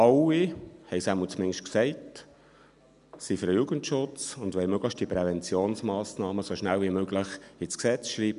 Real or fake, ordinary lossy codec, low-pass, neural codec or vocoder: real; none; 10.8 kHz; none